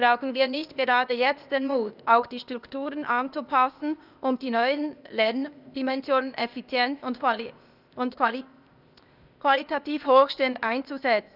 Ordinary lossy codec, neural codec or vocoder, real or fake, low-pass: none; codec, 16 kHz, 0.8 kbps, ZipCodec; fake; 5.4 kHz